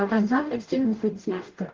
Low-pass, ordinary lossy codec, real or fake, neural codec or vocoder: 7.2 kHz; Opus, 16 kbps; fake; codec, 44.1 kHz, 0.9 kbps, DAC